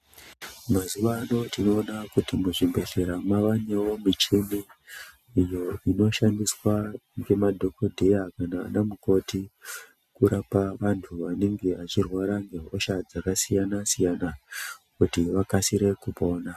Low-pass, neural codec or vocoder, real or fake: 14.4 kHz; none; real